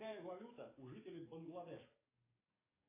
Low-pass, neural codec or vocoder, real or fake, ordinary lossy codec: 3.6 kHz; codec, 44.1 kHz, 7.8 kbps, DAC; fake; AAC, 24 kbps